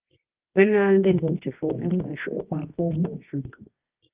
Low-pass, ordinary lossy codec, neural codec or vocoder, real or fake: 3.6 kHz; Opus, 24 kbps; codec, 24 kHz, 0.9 kbps, WavTokenizer, medium music audio release; fake